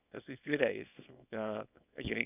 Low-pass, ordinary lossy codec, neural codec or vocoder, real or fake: 3.6 kHz; none; codec, 24 kHz, 0.9 kbps, WavTokenizer, small release; fake